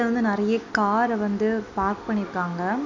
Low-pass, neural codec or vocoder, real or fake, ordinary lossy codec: 7.2 kHz; none; real; none